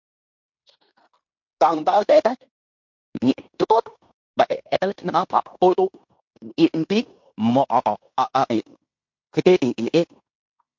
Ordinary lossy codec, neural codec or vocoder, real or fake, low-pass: MP3, 48 kbps; codec, 16 kHz in and 24 kHz out, 0.9 kbps, LongCat-Audio-Codec, fine tuned four codebook decoder; fake; 7.2 kHz